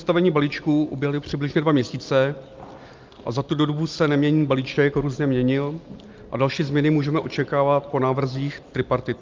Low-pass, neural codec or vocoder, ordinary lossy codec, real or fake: 7.2 kHz; none; Opus, 24 kbps; real